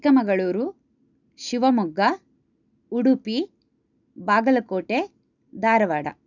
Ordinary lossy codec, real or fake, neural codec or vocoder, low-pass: none; real; none; 7.2 kHz